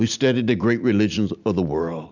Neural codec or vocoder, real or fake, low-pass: none; real; 7.2 kHz